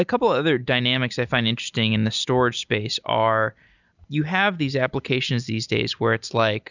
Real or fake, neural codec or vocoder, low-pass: real; none; 7.2 kHz